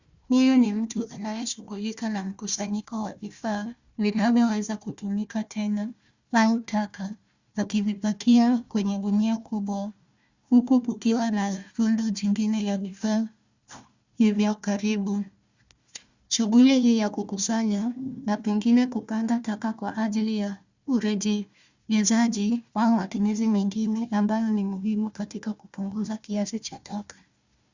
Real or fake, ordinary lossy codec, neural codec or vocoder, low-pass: fake; Opus, 64 kbps; codec, 16 kHz, 1 kbps, FunCodec, trained on Chinese and English, 50 frames a second; 7.2 kHz